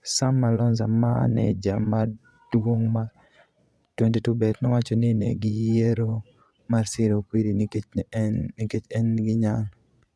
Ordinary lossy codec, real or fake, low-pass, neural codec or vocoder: none; fake; none; vocoder, 22.05 kHz, 80 mel bands, Vocos